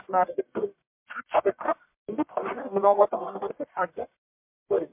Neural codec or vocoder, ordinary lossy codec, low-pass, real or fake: codec, 44.1 kHz, 1.7 kbps, Pupu-Codec; MP3, 32 kbps; 3.6 kHz; fake